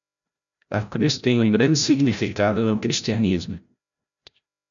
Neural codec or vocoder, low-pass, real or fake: codec, 16 kHz, 0.5 kbps, FreqCodec, larger model; 7.2 kHz; fake